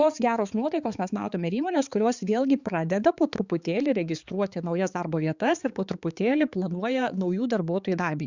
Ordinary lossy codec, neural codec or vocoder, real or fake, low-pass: Opus, 64 kbps; codec, 16 kHz, 4 kbps, X-Codec, HuBERT features, trained on balanced general audio; fake; 7.2 kHz